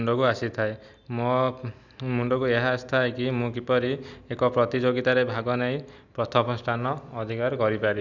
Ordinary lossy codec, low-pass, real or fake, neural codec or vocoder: none; 7.2 kHz; real; none